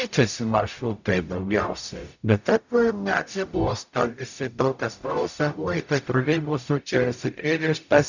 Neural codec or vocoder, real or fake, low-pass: codec, 44.1 kHz, 0.9 kbps, DAC; fake; 7.2 kHz